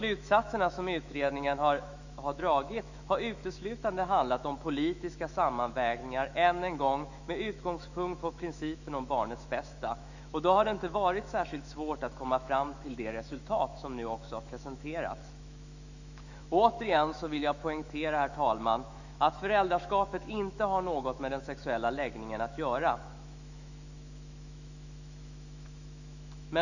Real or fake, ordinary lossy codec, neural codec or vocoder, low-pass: fake; none; autoencoder, 48 kHz, 128 numbers a frame, DAC-VAE, trained on Japanese speech; 7.2 kHz